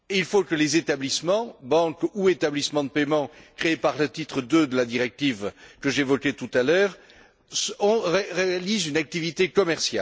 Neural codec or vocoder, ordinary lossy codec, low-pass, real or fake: none; none; none; real